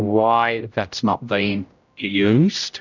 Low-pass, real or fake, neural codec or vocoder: 7.2 kHz; fake; codec, 16 kHz, 0.5 kbps, X-Codec, HuBERT features, trained on general audio